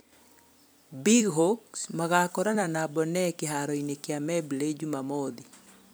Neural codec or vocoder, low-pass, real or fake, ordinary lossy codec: vocoder, 44.1 kHz, 128 mel bands every 256 samples, BigVGAN v2; none; fake; none